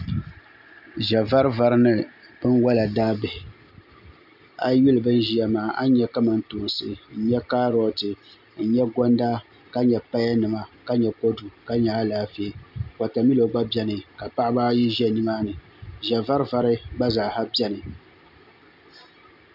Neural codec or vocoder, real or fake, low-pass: none; real; 5.4 kHz